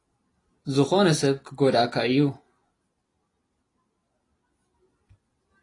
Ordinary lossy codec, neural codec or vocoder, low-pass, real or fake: AAC, 32 kbps; none; 10.8 kHz; real